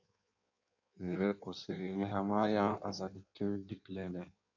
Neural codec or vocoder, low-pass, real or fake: codec, 16 kHz in and 24 kHz out, 1.1 kbps, FireRedTTS-2 codec; 7.2 kHz; fake